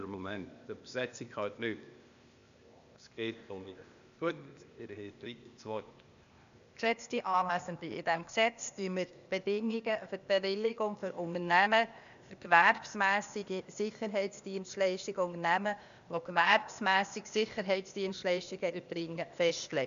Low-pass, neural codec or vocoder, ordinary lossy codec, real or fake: 7.2 kHz; codec, 16 kHz, 0.8 kbps, ZipCodec; none; fake